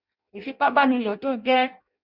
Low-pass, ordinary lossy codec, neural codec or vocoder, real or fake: 5.4 kHz; Opus, 64 kbps; codec, 16 kHz in and 24 kHz out, 0.6 kbps, FireRedTTS-2 codec; fake